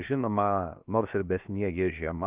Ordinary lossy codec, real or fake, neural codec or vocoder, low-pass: Opus, 32 kbps; fake; codec, 16 kHz, 0.7 kbps, FocalCodec; 3.6 kHz